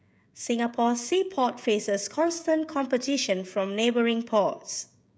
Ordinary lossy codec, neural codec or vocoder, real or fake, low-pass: none; codec, 16 kHz, 16 kbps, FreqCodec, smaller model; fake; none